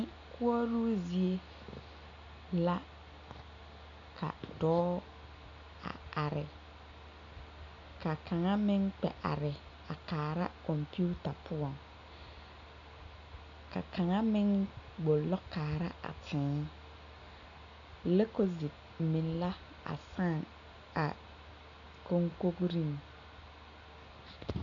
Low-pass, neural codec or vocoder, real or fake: 7.2 kHz; none; real